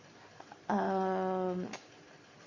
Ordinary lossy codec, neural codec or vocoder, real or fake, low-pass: Opus, 64 kbps; none; real; 7.2 kHz